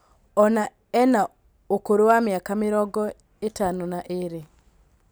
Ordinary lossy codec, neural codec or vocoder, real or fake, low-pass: none; none; real; none